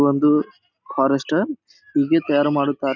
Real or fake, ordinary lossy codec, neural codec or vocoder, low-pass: real; none; none; 7.2 kHz